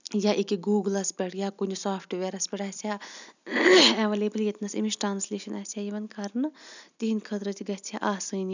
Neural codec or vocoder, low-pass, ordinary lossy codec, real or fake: none; 7.2 kHz; none; real